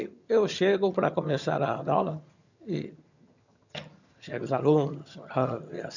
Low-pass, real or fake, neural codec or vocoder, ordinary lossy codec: 7.2 kHz; fake; vocoder, 22.05 kHz, 80 mel bands, HiFi-GAN; none